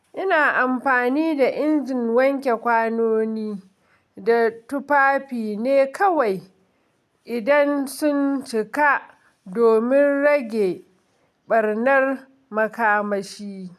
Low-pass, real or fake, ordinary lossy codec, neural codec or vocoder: 14.4 kHz; real; none; none